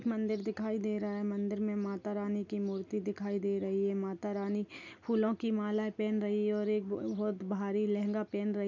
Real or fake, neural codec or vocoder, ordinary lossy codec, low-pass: real; none; none; 7.2 kHz